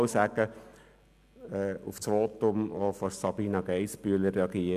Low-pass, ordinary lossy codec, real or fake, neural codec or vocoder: 14.4 kHz; none; real; none